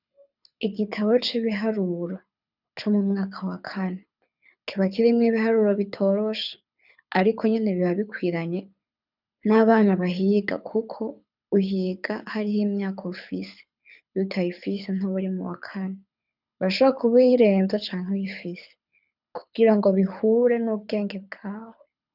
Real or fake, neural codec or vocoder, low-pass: fake; codec, 24 kHz, 6 kbps, HILCodec; 5.4 kHz